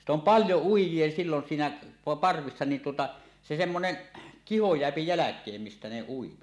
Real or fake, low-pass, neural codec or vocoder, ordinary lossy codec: real; none; none; none